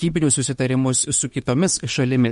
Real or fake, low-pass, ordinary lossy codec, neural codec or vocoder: fake; 19.8 kHz; MP3, 48 kbps; autoencoder, 48 kHz, 32 numbers a frame, DAC-VAE, trained on Japanese speech